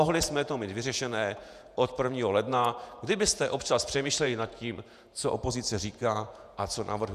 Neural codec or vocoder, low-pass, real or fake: vocoder, 48 kHz, 128 mel bands, Vocos; 14.4 kHz; fake